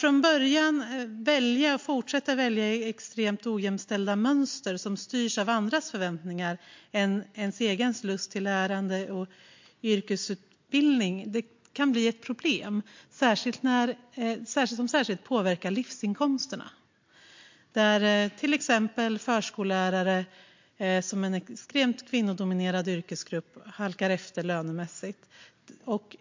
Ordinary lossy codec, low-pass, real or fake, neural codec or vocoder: MP3, 48 kbps; 7.2 kHz; real; none